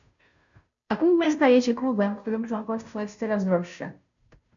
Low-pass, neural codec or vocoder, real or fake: 7.2 kHz; codec, 16 kHz, 0.5 kbps, FunCodec, trained on Chinese and English, 25 frames a second; fake